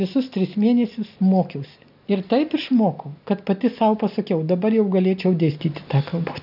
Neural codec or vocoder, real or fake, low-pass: none; real; 5.4 kHz